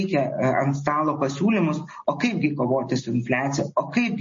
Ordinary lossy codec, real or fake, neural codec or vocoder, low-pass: MP3, 32 kbps; real; none; 7.2 kHz